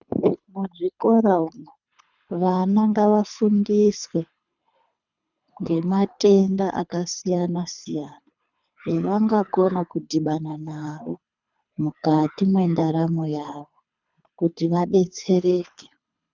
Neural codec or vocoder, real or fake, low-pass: codec, 24 kHz, 3 kbps, HILCodec; fake; 7.2 kHz